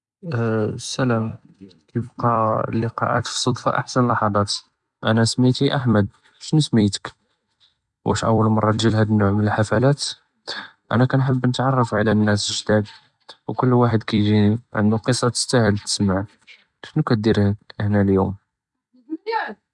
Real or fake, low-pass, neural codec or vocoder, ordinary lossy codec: fake; 10.8 kHz; vocoder, 44.1 kHz, 128 mel bands every 256 samples, BigVGAN v2; none